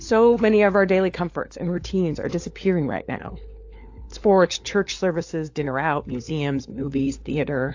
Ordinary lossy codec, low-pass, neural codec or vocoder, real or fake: AAC, 48 kbps; 7.2 kHz; codec, 16 kHz, 4 kbps, FunCodec, trained on LibriTTS, 50 frames a second; fake